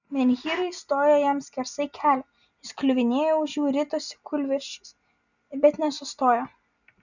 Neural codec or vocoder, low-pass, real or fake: none; 7.2 kHz; real